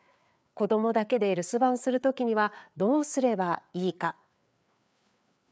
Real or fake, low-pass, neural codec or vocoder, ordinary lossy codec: fake; none; codec, 16 kHz, 4 kbps, FreqCodec, larger model; none